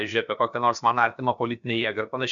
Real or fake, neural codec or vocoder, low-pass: fake; codec, 16 kHz, about 1 kbps, DyCAST, with the encoder's durations; 7.2 kHz